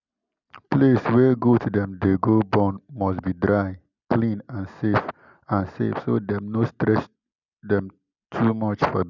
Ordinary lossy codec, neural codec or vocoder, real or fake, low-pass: none; none; real; 7.2 kHz